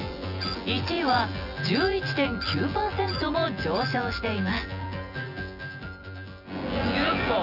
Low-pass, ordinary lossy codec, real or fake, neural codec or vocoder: 5.4 kHz; none; fake; vocoder, 24 kHz, 100 mel bands, Vocos